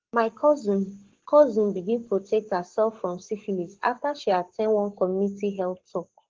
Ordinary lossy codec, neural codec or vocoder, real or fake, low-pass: Opus, 16 kbps; codec, 44.1 kHz, 7.8 kbps, Pupu-Codec; fake; 7.2 kHz